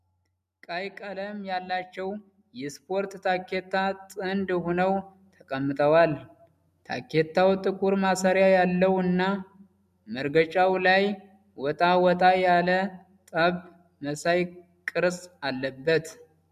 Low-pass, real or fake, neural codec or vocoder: 14.4 kHz; real; none